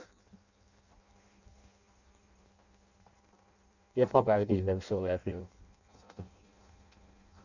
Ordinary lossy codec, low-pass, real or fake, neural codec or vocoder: none; 7.2 kHz; fake; codec, 16 kHz in and 24 kHz out, 0.6 kbps, FireRedTTS-2 codec